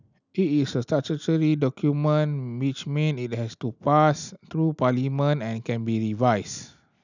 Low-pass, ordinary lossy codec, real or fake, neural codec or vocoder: 7.2 kHz; none; real; none